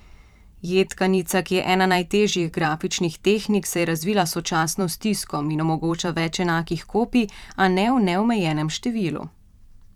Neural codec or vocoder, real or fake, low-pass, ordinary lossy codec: vocoder, 44.1 kHz, 128 mel bands every 512 samples, BigVGAN v2; fake; 19.8 kHz; none